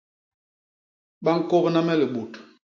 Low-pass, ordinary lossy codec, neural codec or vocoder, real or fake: 7.2 kHz; MP3, 48 kbps; none; real